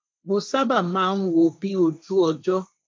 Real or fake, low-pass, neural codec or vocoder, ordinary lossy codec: fake; none; codec, 16 kHz, 1.1 kbps, Voila-Tokenizer; none